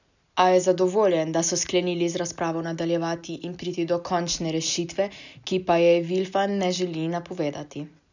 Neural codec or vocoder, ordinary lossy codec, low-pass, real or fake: none; none; 7.2 kHz; real